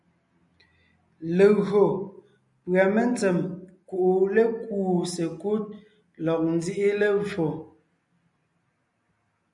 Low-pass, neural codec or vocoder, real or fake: 10.8 kHz; none; real